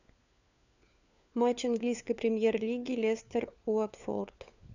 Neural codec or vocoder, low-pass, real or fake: codec, 16 kHz, 4 kbps, FunCodec, trained on LibriTTS, 50 frames a second; 7.2 kHz; fake